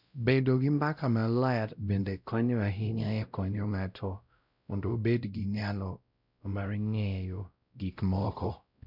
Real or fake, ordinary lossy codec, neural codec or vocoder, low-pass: fake; none; codec, 16 kHz, 0.5 kbps, X-Codec, WavLM features, trained on Multilingual LibriSpeech; 5.4 kHz